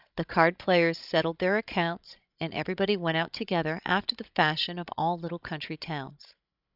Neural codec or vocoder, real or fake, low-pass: codec, 16 kHz, 16 kbps, FreqCodec, larger model; fake; 5.4 kHz